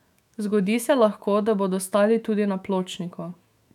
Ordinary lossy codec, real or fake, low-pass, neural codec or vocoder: none; fake; 19.8 kHz; autoencoder, 48 kHz, 128 numbers a frame, DAC-VAE, trained on Japanese speech